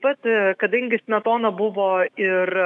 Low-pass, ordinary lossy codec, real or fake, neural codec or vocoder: 10.8 kHz; MP3, 96 kbps; fake; autoencoder, 48 kHz, 128 numbers a frame, DAC-VAE, trained on Japanese speech